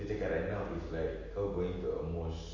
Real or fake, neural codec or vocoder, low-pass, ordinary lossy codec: real; none; 7.2 kHz; MP3, 32 kbps